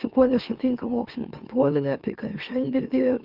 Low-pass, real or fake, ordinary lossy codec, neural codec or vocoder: 5.4 kHz; fake; Opus, 32 kbps; autoencoder, 44.1 kHz, a latent of 192 numbers a frame, MeloTTS